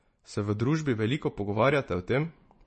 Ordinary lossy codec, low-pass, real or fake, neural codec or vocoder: MP3, 32 kbps; 10.8 kHz; fake; vocoder, 24 kHz, 100 mel bands, Vocos